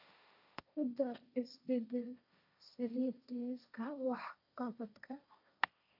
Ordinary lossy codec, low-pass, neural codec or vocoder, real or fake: none; 5.4 kHz; codec, 16 kHz, 1.1 kbps, Voila-Tokenizer; fake